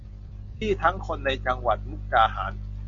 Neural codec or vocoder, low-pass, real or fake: none; 7.2 kHz; real